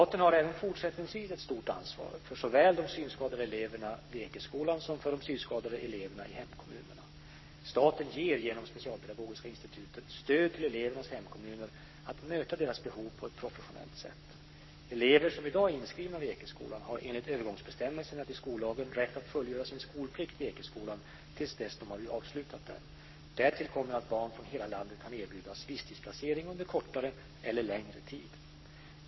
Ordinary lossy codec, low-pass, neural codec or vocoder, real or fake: MP3, 24 kbps; 7.2 kHz; codec, 44.1 kHz, 7.8 kbps, Pupu-Codec; fake